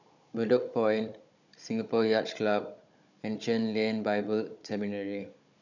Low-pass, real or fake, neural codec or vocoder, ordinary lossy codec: 7.2 kHz; fake; codec, 16 kHz, 16 kbps, FunCodec, trained on Chinese and English, 50 frames a second; none